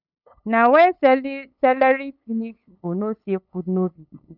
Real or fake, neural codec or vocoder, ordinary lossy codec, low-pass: fake; codec, 16 kHz, 8 kbps, FunCodec, trained on LibriTTS, 25 frames a second; none; 5.4 kHz